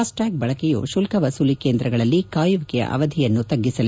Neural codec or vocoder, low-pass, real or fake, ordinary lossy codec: none; none; real; none